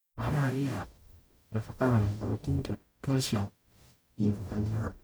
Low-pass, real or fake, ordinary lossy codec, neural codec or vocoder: none; fake; none; codec, 44.1 kHz, 0.9 kbps, DAC